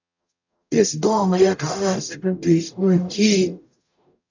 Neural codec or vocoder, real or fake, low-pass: codec, 44.1 kHz, 0.9 kbps, DAC; fake; 7.2 kHz